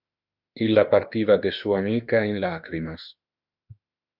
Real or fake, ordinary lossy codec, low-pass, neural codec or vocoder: fake; Opus, 64 kbps; 5.4 kHz; autoencoder, 48 kHz, 32 numbers a frame, DAC-VAE, trained on Japanese speech